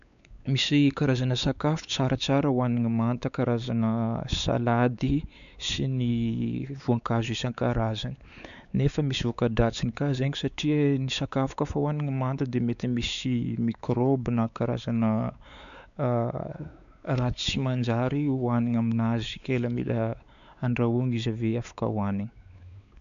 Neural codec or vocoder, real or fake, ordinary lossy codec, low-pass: codec, 16 kHz, 4 kbps, X-Codec, WavLM features, trained on Multilingual LibriSpeech; fake; none; 7.2 kHz